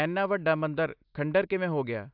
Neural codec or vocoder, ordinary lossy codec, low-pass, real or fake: none; AAC, 48 kbps; 5.4 kHz; real